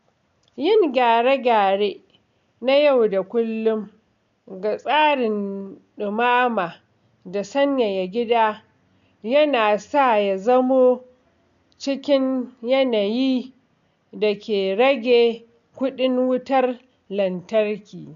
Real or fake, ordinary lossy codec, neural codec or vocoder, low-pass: real; none; none; 7.2 kHz